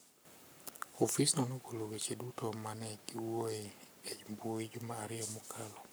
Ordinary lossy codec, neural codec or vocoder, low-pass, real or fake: none; codec, 44.1 kHz, 7.8 kbps, Pupu-Codec; none; fake